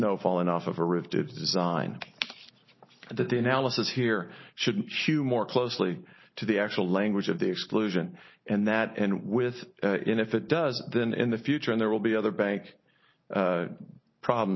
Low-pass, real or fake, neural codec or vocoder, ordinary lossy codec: 7.2 kHz; real; none; MP3, 24 kbps